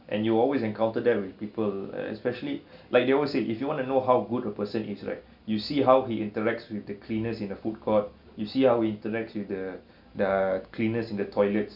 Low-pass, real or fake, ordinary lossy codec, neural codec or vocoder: 5.4 kHz; real; none; none